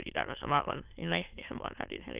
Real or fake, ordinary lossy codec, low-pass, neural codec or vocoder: fake; Opus, 24 kbps; 3.6 kHz; autoencoder, 22.05 kHz, a latent of 192 numbers a frame, VITS, trained on many speakers